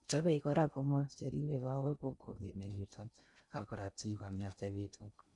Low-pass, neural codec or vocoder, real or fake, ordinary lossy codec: 10.8 kHz; codec, 16 kHz in and 24 kHz out, 0.6 kbps, FocalCodec, streaming, 4096 codes; fake; none